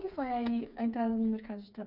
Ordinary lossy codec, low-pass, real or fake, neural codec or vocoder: none; 5.4 kHz; fake; codec, 16 kHz, 4 kbps, FreqCodec, smaller model